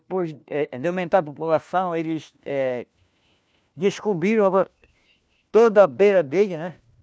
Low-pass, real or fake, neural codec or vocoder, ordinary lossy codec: none; fake; codec, 16 kHz, 1 kbps, FunCodec, trained on LibriTTS, 50 frames a second; none